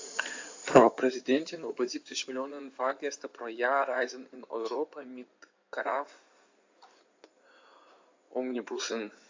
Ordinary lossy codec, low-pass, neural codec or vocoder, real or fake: AAC, 48 kbps; 7.2 kHz; codec, 16 kHz in and 24 kHz out, 2.2 kbps, FireRedTTS-2 codec; fake